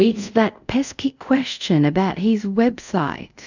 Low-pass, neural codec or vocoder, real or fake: 7.2 kHz; codec, 24 kHz, 0.5 kbps, DualCodec; fake